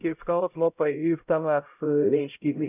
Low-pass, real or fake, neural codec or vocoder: 3.6 kHz; fake; codec, 16 kHz, 0.5 kbps, X-Codec, HuBERT features, trained on LibriSpeech